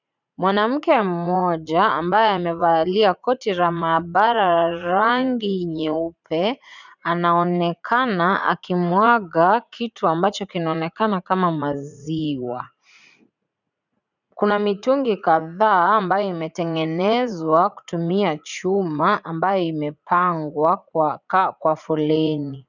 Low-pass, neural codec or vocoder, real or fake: 7.2 kHz; vocoder, 44.1 kHz, 80 mel bands, Vocos; fake